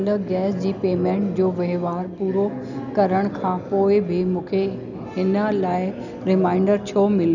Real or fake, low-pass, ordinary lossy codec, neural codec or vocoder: real; 7.2 kHz; none; none